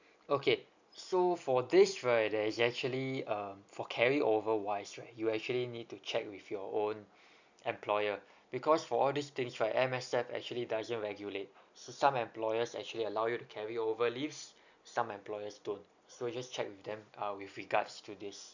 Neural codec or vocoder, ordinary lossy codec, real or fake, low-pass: none; none; real; 7.2 kHz